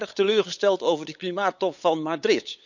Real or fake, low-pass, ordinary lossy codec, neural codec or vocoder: fake; 7.2 kHz; none; codec, 16 kHz, 8 kbps, FunCodec, trained on LibriTTS, 25 frames a second